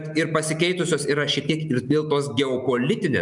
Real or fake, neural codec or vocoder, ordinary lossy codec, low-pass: real; none; MP3, 96 kbps; 10.8 kHz